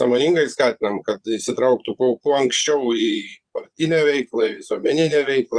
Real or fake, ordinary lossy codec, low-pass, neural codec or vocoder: fake; Opus, 64 kbps; 9.9 kHz; vocoder, 22.05 kHz, 80 mel bands, Vocos